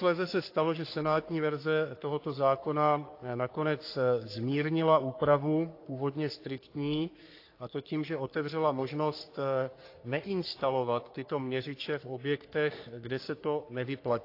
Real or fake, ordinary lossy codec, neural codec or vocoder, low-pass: fake; AAC, 32 kbps; codec, 44.1 kHz, 3.4 kbps, Pupu-Codec; 5.4 kHz